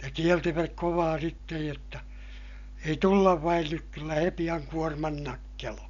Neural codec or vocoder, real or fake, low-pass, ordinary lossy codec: none; real; 7.2 kHz; none